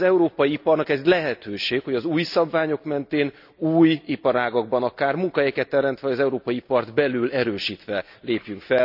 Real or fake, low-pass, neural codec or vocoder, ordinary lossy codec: real; 5.4 kHz; none; none